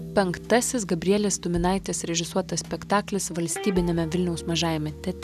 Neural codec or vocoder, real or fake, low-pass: none; real; 14.4 kHz